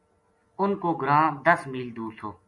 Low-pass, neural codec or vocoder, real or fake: 10.8 kHz; none; real